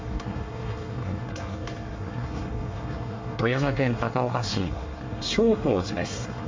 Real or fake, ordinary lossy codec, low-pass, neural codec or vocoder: fake; MP3, 48 kbps; 7.2 kHz; codec, 24 kHz, 1 kbps, SNAC